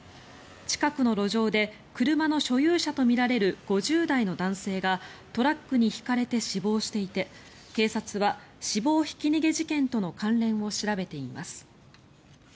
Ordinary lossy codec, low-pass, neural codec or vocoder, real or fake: none; none; none; real